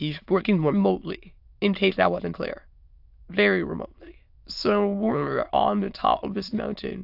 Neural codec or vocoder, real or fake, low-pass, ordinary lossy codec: autoencoder, 22.05 kHz, a latent of 192 numbers a frame, VITS, trained on many speakers; fake; 5.4 kHz; AAC, 48 kbps